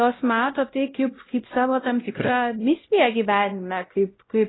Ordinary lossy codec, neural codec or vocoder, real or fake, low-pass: AAC, 16 kbps; codec, 16 kHz, 0.5 kbps, X-Codec, WavLM features, trained on Multilingual LibriSpeech; fake; 7.2 kHz